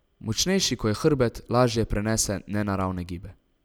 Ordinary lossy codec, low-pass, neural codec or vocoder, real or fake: none; none; none; real